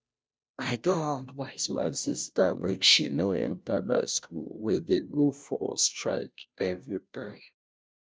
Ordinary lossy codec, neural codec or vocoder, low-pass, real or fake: none; codec, 16 kHz, 0.5 kbps, FunCodec, trained on Chinese and English, 25 frames a second; none; fake